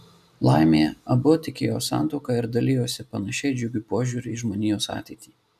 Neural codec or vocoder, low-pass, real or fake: none; 14.4 kHz; real